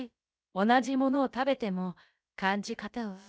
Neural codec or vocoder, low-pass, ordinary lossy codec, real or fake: codec, 16 kHz, about 1 kbps, DyCAST, with the encoder's durations; none; none; fake